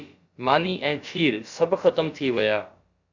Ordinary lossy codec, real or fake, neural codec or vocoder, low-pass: Opus, 64 kbps; fake; codec, 16 kHz, about 1 kbps, DyCAST, with the encoder's durations; 7.2 kHz